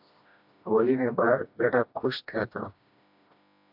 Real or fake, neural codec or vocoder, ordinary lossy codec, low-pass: fake; codec, 16 kHz, 1 kbps, FreqCodec, smaller model; MP3, 48 kbps; 5.4 kHz